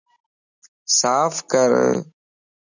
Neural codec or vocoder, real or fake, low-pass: none; real; 7.2 kHz